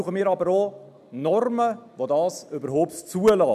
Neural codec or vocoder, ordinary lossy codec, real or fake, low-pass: none; none; real; 14.4 kHz